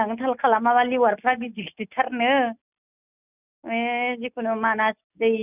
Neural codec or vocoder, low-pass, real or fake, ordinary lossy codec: none; 3.6 kHz; real; none